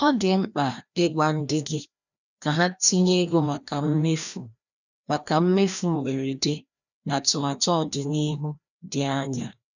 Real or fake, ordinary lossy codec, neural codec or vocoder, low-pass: fake; none; codec, 16 kHz, 1 kbps, FreqCodec, larger model; 7.2 kHz